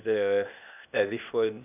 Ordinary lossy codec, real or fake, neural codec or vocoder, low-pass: none; fake; codec, 16 kHz, 0.8 kbps, ZipCodec; 3.6 kHz